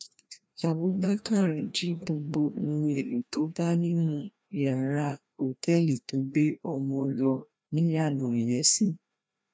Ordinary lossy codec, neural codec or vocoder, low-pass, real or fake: none; codec, 16 kHz, 1 kbps, FreqCodec, larger model; none; fake